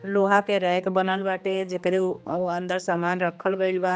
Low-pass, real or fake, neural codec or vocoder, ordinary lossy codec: none; fake; codec, 16 kHz, 2 kbps, X-Codec, HuBERT features, trained on general audio; none